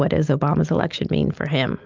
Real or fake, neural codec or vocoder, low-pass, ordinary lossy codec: real; none; 7.2 kHz; Opus, 24 kbps